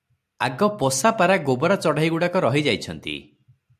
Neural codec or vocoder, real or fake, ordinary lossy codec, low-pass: none; real; AAC, 96 kbps; 14.4 kHz